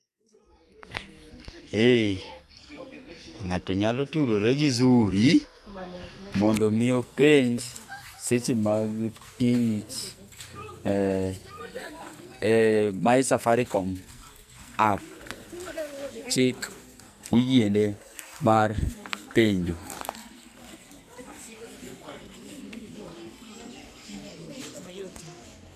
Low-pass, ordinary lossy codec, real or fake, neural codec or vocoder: 14.4 kHz; none; fake; codec, 44.1 kHz, 2.6 kbps, SNAC